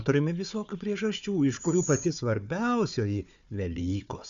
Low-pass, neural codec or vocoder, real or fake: 7.2 kHz; codec, 16 kHz, 4 kbps, FunCodec, trained on Chinese and English, 50 frames a second; fake